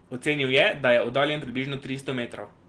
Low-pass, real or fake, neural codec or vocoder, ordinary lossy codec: 9.9 kHz; real; none; Opus, 16 kbps